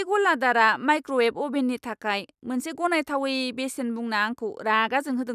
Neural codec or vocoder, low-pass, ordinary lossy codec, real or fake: none; 14.4 kHz; none; real